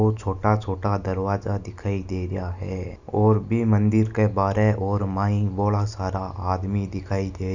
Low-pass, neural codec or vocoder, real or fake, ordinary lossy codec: 7.2 kHz; none; real; none